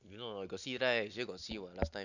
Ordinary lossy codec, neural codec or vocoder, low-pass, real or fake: none; none; 7.2 kHz; real